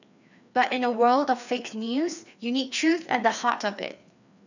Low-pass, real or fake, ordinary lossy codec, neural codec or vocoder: 7.2 kHz; fake; none; codec, 16 kHz, 2 kbps, FreqCodec, larger model